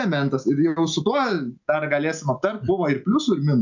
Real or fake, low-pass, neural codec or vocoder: real; 7.2 kHz; none